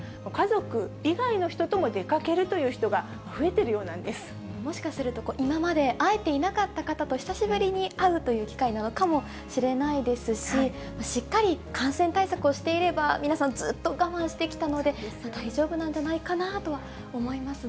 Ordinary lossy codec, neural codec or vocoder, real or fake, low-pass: none; none; real; none